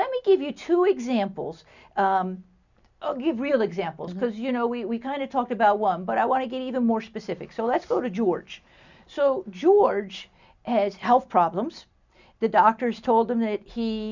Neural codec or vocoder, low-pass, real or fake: none; 7.2 kHz; real